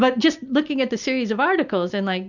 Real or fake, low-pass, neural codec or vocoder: real; 7.2 kHz; none